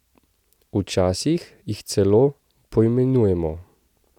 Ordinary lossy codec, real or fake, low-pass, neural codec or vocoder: none; real; 19.8 kHz; none